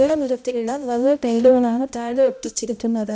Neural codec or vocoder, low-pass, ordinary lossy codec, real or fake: codec, 16 kHz, 0.5 kbps, X-Codec, HuBERT features, trained on balanced general audio; none; none; fake